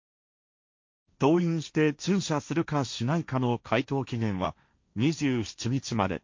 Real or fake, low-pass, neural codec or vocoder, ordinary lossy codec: fake; 7.2 kHz; codec, 16 kHz, 1.1 kbps, Voila-Tokenizer; MP3, 48 kbps